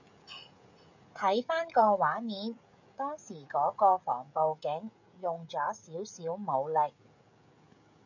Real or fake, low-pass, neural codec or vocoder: fake; 7.2 kHz; codec, 16 kHz, 16 kbps, FreqCodec, smaller model